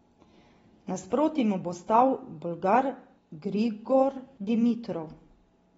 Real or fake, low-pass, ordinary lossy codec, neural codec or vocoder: real; 19.8 kHz; AAC, 24 kbps; none